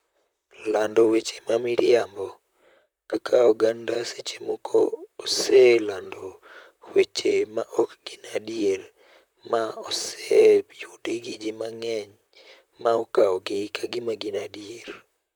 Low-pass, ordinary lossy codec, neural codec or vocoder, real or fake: none; none; vocoder, 44.1 kHz, 128 mel bands, Pupu-Vocoder; fake